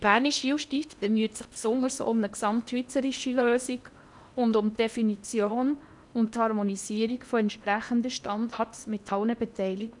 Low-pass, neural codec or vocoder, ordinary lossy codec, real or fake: 10.8 kHz; codec, 16 kHz in and 24 kHz out, 0.8 kbps, FocalCodec, streaming, 65536 codes; none; fake